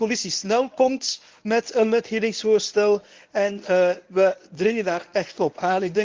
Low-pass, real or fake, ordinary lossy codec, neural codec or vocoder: 7.2 kHz; fake; Opus, 32 kbps; codec, 24 kHz, 0.9 kbps, WavTokenizer, medium speech release version 1